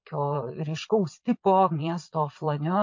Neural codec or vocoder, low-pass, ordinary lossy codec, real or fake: vocoder, 22.05 kHz, 80 mel bands, Vocos; 7.2 kHz; MP3, 48 kbps; fake